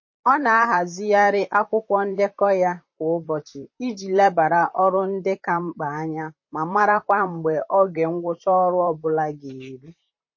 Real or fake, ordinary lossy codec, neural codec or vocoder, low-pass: fake; MP3, 32 kbps; vocoder, 44.1 kHz, 128 mel bands, Pupu-Vocoder; 7.2 kHz